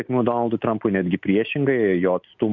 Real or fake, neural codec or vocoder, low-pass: real; none; 7.2 kHz